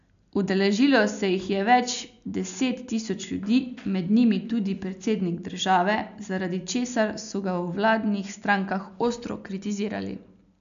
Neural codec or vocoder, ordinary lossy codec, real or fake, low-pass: none; none; real; 7.2 kHz